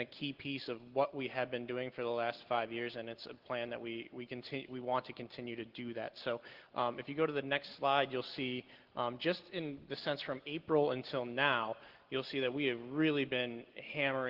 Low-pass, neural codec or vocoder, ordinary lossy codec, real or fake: 5.4 kHz; none; Opus, 16 kbps; real